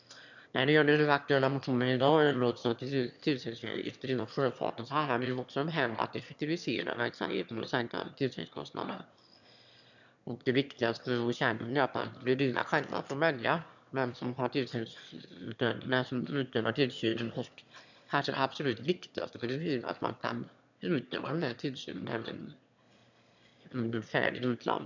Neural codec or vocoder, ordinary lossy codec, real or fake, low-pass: autoencoder, 22.05 kHz, a latent of 192 numbers a frame, VITS, trained on one speaker; none; fake; 7.2 kHz